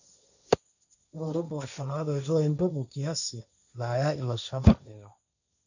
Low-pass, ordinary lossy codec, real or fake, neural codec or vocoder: 7.2 kHz; none; fake; codec, 16 kHz, 1.1 kbps, Voila-Tokenizer